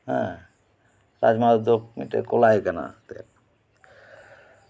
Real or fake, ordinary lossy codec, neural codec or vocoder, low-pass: real; none; none; none